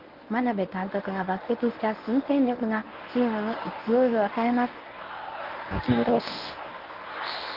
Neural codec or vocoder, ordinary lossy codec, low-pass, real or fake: codec, 24 kHz, 0.9 kbps, WavTokenizer, medium speech release version 1; Opus, 24 kbps; 5.4 kHz; fake